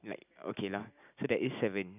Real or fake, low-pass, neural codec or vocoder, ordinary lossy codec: real; 3.6 kHz; none; none